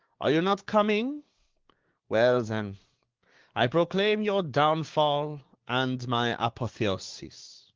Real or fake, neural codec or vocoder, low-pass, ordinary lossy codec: fake; codec, 16 kHz, 6 kbps, DAC; 7.2 kHz; Opus, 16 kbps